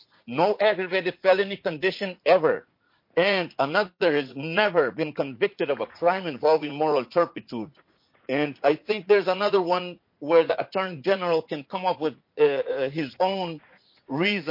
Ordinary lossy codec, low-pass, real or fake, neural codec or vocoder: MP3, 32 kbps; 5.4 kHz; fake; vocoder, 44.1 kHz, 80 mel bands, Vocos